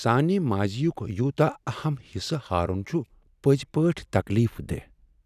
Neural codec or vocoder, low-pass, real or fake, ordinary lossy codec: none; 14.4 kHz; real; none